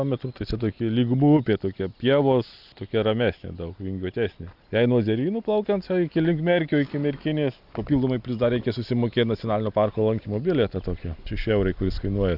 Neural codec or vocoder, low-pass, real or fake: none; 5.4 kHz; real